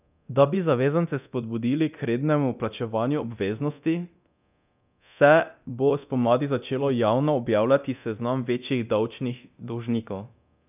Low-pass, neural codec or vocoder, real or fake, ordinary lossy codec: 3.6 kHz; codec, 24 kHz, 0.9 kbps, DualCodec; fake; none